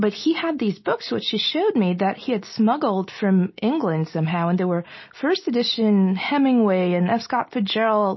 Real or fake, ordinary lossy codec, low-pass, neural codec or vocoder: real; MP3, 24 kbps; 7.2 kHz; none